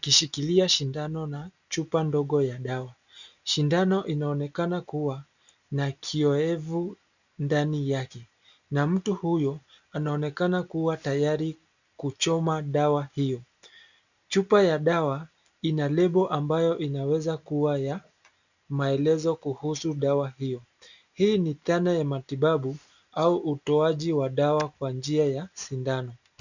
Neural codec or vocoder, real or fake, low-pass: none; real; 7.2 kHz